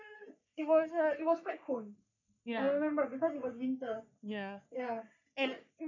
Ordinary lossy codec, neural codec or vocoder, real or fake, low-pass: none; codec, 44.1 kHz, 3.4 kbps, Pupu-Codec; fake; 7.2 kHz